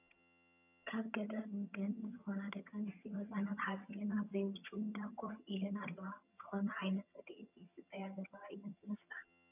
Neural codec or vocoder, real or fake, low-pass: vocoder, 22.05 kHz, 80 mel bands, HiFi-GAN; fake; 3.6 kHz